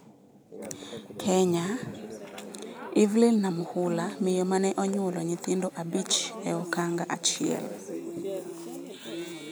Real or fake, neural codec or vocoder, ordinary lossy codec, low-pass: real; none; none; none